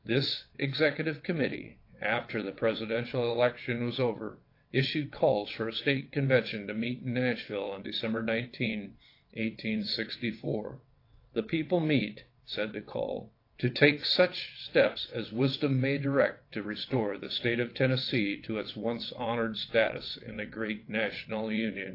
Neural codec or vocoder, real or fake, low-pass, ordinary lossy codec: vocoder, 22.05 kHz, 80 mel bands, WaveNeXt; fake; 5.4 kHz; AAC, 32 kbps